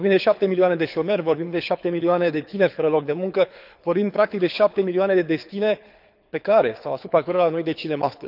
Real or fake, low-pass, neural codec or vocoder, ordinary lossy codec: fake; 5.4 kHz; codec, 24 kHz, 6 kbps, HILCodec; none